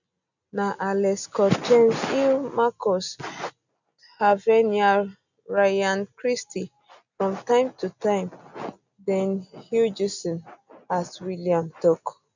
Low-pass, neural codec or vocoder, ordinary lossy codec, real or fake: 7.2 kHz; none; none; real